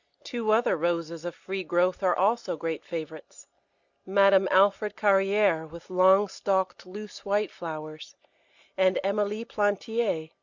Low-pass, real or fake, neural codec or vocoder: 7.2 kHz; real; none